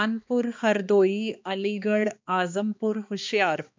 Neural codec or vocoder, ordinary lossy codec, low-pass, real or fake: codec, 16 kHz, 2 kbps, X-Codec, HuBERT features, trained on balanced general audio; MP3, 64 kbps; 7.2 kHz; fake